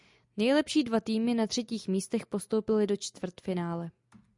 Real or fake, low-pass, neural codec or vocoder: real; 10.8 kHz; none